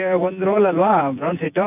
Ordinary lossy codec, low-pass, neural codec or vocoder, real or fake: none; 3.6 kHz; vocoder, 24 kHz, 100 mel bands, Vocos; fake